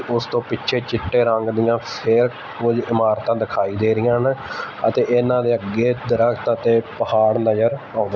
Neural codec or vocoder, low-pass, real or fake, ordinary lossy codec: none; none; real; none